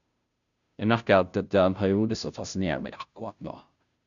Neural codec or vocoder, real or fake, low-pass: codec, 16 kHz, 0.5 kbps, FunCodec, trained on Chinese and English, 25 frames a second; fake; 7.2 kHz